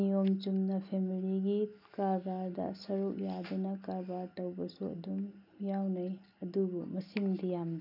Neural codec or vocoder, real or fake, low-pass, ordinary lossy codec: none; real; 5.4 kHz; none